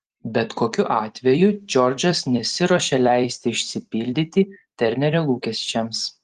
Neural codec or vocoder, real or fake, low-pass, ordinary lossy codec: vocoder, 44.1 kHz, 128 mel bands every 256 samples, BigVGAN v2; fake; 14.4 kHz; Opus, 24 kbps